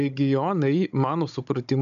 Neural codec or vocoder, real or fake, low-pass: codec, 16 kHz, 16 kbps, FunCodec, trained on Chinese and English, 50 frames a second; fake; 7.2 kHz